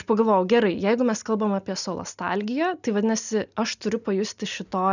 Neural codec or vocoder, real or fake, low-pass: none; real; 7.2 kHz